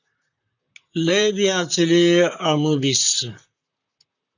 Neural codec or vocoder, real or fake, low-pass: vocoder, 44.1 kHz, 128 mel bands, Pupu-Vocoder; fake; 7.2 kHz